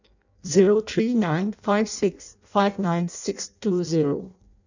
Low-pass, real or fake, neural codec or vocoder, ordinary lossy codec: 7.2 kHz; fake; codec, 16 kHz in and 24 kHz out, 0.6 kbps, FireRedTTS-2 codec; none